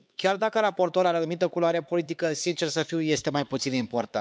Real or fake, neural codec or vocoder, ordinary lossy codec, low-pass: fake; codec, 16 kHz, 4 kbps, X-Codec, HuBERT features, trained on LibriSpeech; none; none